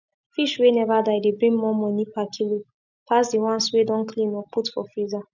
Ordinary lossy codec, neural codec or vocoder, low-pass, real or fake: none; none; 7.2 kHz; real